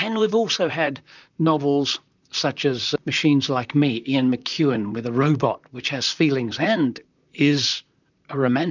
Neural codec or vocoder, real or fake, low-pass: vocoder, 44.1 kHz, 128 mel bands, Pupu-Vocoder; fake; 7.2 kHz